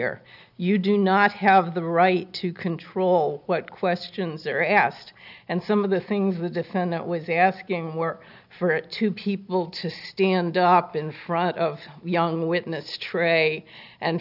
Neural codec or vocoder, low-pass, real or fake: none; 5.4 kHz; real